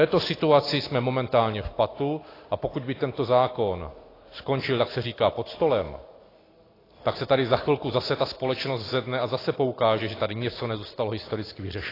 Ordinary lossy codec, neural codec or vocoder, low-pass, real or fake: AAC, 24 kbps; none; 5.4 kHz; real